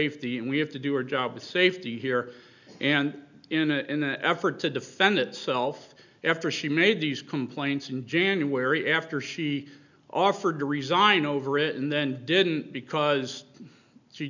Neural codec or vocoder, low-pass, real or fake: none; 7.2 kHz; real